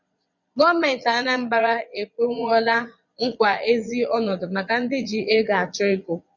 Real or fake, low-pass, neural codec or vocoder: fake; 7.2 kHz; vocoder, 22.05 kHz, 80 mel bands, Vocos